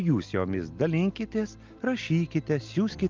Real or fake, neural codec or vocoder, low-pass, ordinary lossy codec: real; none; 7.2 kHz; Opus, 24 kbps